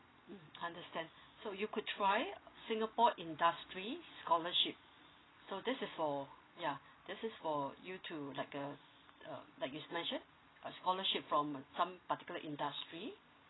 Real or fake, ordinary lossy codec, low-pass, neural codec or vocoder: real; AAC, 16 kbps; 7.2 kHz; none